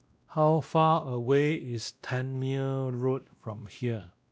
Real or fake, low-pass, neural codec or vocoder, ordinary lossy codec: fake; none; codec, 16 kHz, 1 kbps, X-Codec, WavLM features, trained on Multilingual LibriSpeech; none